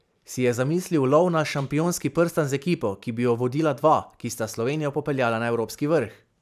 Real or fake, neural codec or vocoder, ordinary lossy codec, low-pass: fake; vocoder, 44.1 kHz, 128 mel bands every 512 samples, BigVGAN v2; none; 14.4 kHz